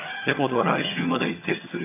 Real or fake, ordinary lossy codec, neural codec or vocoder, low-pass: fake; none; vocoder, 22.05 kHz, 80 mel bands, HiFi-GAN; 3.6 kHz